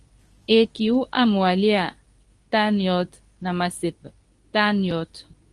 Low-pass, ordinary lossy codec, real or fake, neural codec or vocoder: 10.8 kHz; Opus, 24 kbps; fake; codec, 24 kHz, 0.9 kbps, WavTokenizer, medium speech release version 2